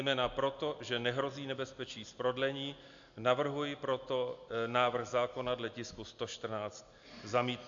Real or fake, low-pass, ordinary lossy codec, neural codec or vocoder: real; 7.2 kHz; AAC, 64 kbps; none